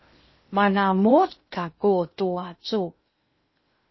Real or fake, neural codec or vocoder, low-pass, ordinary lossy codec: fake; codec, 16 kHz in and 24 kHz out, 0.6 kbps, FocalCodec, streaming, 2048 codes; 7.2 kHz; MP3, 24 kbps